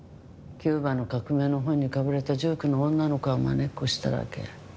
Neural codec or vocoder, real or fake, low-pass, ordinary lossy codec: none; real; none; none